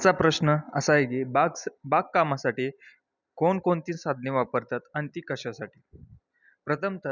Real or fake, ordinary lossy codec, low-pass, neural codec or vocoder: real; none; 7.2 kHz; none